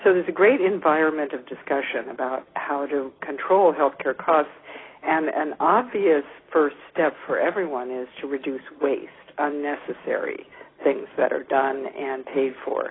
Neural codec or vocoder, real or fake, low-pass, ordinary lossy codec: none; real; 7.2 kHz; AAC, 16 kbps